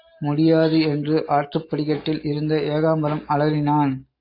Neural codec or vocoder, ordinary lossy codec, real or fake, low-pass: none; AAC, 32 kbps; real; 5.4 kHz